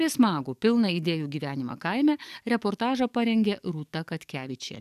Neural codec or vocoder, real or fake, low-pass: codec, 44.1 kHz, 7.8 kbps, DAC; fake; 14.4 kHz